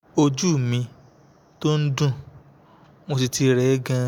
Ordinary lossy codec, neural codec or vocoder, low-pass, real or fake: none; none; 19.8 kHz; real